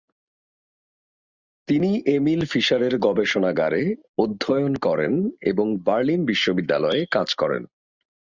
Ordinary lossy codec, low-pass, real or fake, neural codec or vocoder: Opus, 64 kbps; 7.2 kHz; real; none